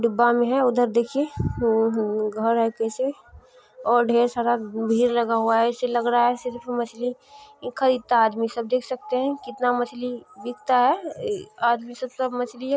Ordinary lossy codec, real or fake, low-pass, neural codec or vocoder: none; real; none; none